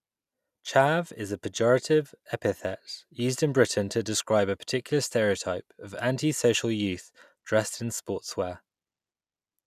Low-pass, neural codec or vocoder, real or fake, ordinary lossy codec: 14.4 kHz; none; real; none